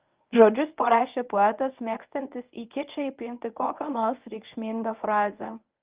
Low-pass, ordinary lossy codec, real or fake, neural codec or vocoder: 3.6 kHz; Opus, 24 kbps; fake; codec, 24 kHz, 0.9 kbps, WavTokenizer, medium speech release version 1